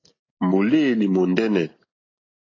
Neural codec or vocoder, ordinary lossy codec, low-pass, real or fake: none; AAC, 32 kbps; 7.2 kHz; real